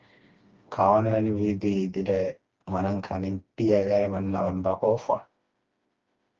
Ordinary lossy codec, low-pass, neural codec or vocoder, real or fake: Opus, 24 kbps; 7.2 kHz; codec, 16 kHz, 2 kbps, FreqCodec, smaller model; fake